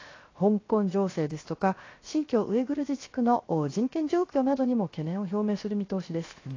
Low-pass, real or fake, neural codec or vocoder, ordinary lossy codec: 7.2 kHz; fake; codec, 16 kHz, 0.7 kbps, FocalCodec; AAC, 32 kbps